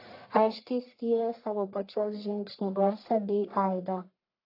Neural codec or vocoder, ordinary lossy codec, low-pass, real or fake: codec, 44.1 kHz, 1.7 kbps, Pupu-Codec; AAC, 32 kbps; 5.4 kHz; fake